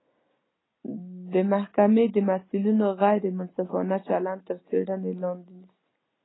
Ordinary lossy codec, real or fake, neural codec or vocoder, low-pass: AAC, 16 kbps; real; none; 7.2 kHz